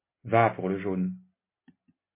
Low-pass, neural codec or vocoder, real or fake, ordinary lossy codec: 3.6 kHz; none; real; MP3, 16 kbps